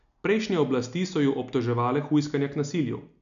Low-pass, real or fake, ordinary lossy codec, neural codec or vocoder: 7.2 kHz; real; none; none